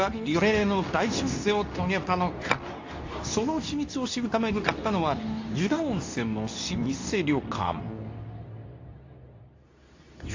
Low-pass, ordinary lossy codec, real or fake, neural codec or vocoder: 7.2 kHz; AAC, 48 kbps; fake; codec, 24 kHz, 0.9 kbps, WavTokenizer, medium speech release version 2